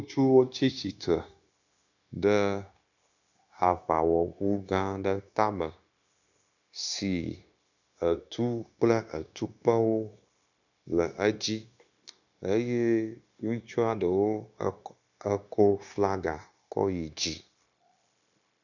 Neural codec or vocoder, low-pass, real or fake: codec, 16 kHz, 0.9 kbps, LongCat-Audio-Codec; 7.2 kHz; fake